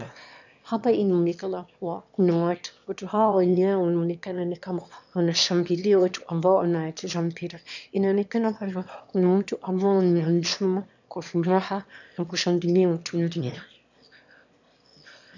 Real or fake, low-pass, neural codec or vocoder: fake; 7.2 kHz; autoencoder, 22.05 kHz, a latent of 192 numbers a frame, VITS, trained on one speaker